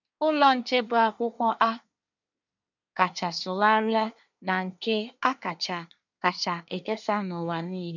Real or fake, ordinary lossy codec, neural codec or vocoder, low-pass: fake; none; codec, 24 kHz, 1 kbps, SNAC; 7.2 kHz